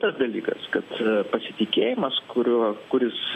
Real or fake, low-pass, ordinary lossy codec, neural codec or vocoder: real; 14.4 kHz; AAC, 48 kbps; none